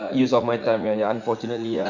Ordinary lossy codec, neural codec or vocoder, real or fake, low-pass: none; vocoder, 44.1 kHz, 80 mel bands, Vocos; fake; 7.2 kHz